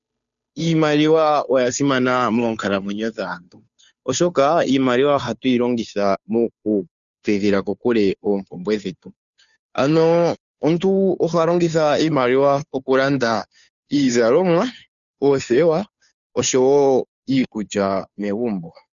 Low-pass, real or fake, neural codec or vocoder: 7.2 kHz; fake; codec, 16 kHz, 2 kbps, FunCodec, trained on Chinese and English, 25 frames a second